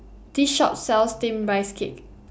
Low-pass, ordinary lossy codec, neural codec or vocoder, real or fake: none; none; none; real